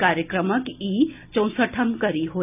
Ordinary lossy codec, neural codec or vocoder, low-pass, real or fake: none; none; 3.6 kHz; real